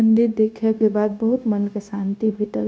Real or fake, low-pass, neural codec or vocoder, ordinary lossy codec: fake; none; codec, 16 kHz, 0.9 kbps, LongCat-Audio-Codec; none